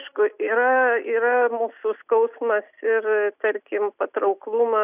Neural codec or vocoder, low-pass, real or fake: none; 3.6 kHz; real